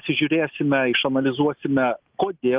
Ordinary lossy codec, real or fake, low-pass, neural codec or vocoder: Opus, 32 kbps; real; 3.6 kHz; none